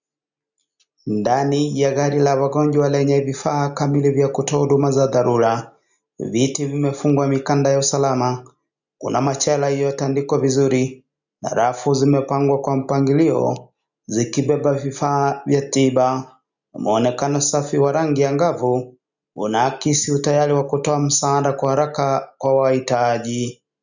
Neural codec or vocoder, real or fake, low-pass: none; real; 7.2 kHz